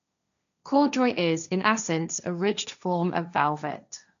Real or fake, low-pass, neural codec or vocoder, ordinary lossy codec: fake; 7.2 kHz; codec, 16 kHz, 1.1 kbps, Voila-Tokenizer; none